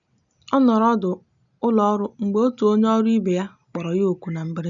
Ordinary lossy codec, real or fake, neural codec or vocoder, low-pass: none; real; none; 7.2 kHz